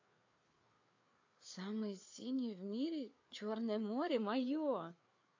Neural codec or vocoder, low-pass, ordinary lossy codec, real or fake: codec, 16 kHz, 4 kbps, FreqCodec, larger model; 7.2 kHz; AAC, 48 kbps; fake